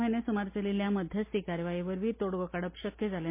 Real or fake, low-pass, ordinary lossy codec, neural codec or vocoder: real; 3.6 kHz; MP3, 24 kbps; none